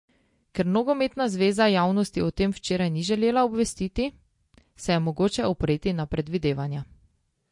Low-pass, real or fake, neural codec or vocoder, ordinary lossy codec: 10.8 kHz; real; none; MP3, 48 kbps